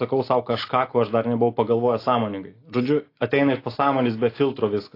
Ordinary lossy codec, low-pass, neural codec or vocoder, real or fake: AAC, 32 kbps; 5.4 kHz; none; real